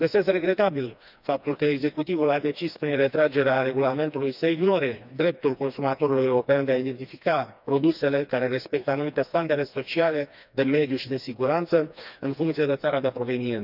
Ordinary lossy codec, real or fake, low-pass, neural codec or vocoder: none; fake; 5.4 kHz; codec, 16 kHz, 2 kbps, FreqCodec, smaller model